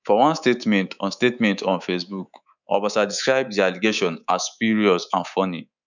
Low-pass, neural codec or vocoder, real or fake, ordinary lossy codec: 7.2 kHz; codec, 24 kHz, 3.1 kbps, DualCodec; fake; none